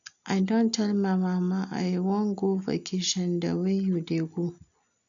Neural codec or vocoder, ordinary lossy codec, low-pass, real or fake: none; MP3, 96 kbps; 7.2 kHz; real